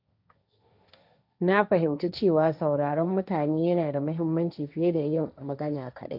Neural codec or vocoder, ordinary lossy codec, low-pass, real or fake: codec, 16 kHz, 1.1 kbps, Voila-Tokenizer; none; 5.4 kHz; fake